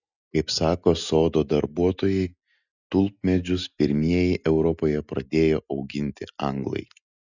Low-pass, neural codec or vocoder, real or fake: 7.2 kHz; none; real